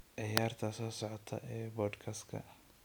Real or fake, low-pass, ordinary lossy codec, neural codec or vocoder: real; none; none; none